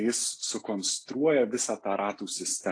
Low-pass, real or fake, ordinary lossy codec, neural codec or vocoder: 9.9 kHz; real; AAC, 48 kbps; none